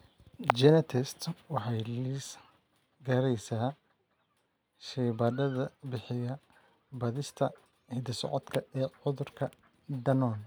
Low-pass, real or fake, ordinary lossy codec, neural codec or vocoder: none; real; none; none